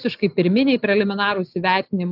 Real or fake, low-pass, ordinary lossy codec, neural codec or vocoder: real; 5.4 kHz; AAC, 48 kbps; none